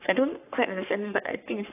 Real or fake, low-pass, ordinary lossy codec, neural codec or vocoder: fake; 3.6 kHz; AAC, 24 kbps; codec, 44.1 kHz, 3.4 kbps, Pupu-Codec